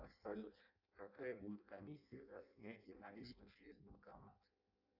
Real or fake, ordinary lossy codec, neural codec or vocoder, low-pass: fake; AAC, 32 kbps; codec, 16 kHz in and 24 kHz out, 0.6 kbps, FireRedTTS-2 codec; 5.4 kHz